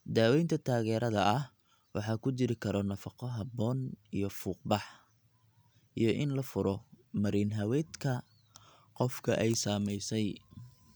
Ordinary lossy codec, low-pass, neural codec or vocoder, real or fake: none; none; none; real